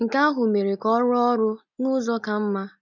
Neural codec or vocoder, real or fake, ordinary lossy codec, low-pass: none; real; none; 7.2 kHz